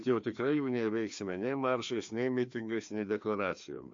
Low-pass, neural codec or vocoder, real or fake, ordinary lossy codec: 7.2 kHz; codec, 16 kHz, 2 kbps, FreqCodec, larger model; fake; MP3, 48 kbps